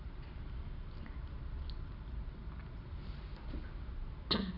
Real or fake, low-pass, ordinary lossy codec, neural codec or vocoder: real; 5.4 kHz; none; none